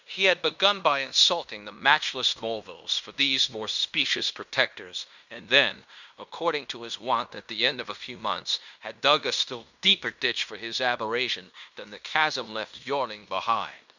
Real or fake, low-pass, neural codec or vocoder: fake; 7.2 kHz; codec, 16 kHz in and 24 kHz out, 0.9 kbps, LongCat-Audio-Codec, fine tuned four codebook decoder